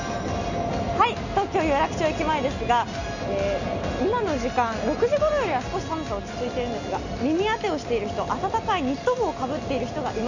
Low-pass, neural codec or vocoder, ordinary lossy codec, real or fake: 7.2 kHz; none; none; real